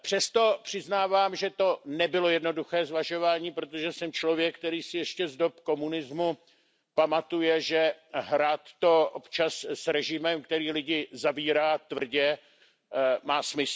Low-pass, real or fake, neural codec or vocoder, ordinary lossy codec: none; real; none; none